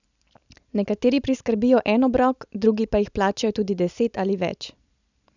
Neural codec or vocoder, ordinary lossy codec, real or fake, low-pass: none; none; real; 7.2 kHz